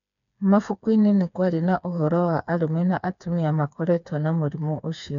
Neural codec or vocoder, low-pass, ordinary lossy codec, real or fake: codec, 16 kHz, 4 kbps, FreqCodec, smaller model; 7.2 kHz; none; fake